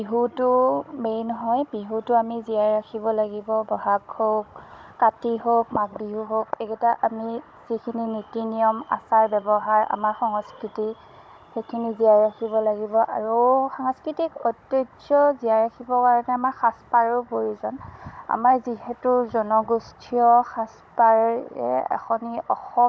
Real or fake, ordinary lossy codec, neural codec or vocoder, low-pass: fake; none; codec, 16 kHz, 16 kbps, FunCodec, trained on Chinese and English, 50 frames a second; none